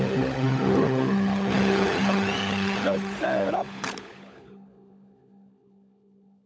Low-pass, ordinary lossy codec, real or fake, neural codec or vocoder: none; none; fake; codec, 16 kHz, 16 kbps, FunCodec, trained on LibriTTS, 50 frames a second